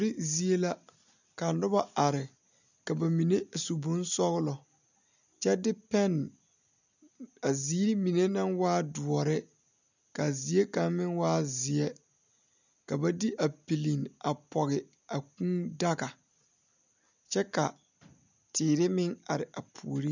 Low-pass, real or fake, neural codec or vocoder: 7.2 kHz; real; none